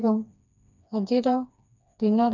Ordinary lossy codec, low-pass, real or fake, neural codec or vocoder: none; 7.2 kHz; fake; codec, 16 kHz, 2 kbps, FreqCodec, smaller model